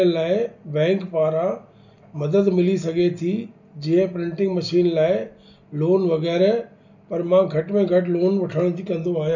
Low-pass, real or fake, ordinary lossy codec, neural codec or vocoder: 7.2 kHz; real; none; none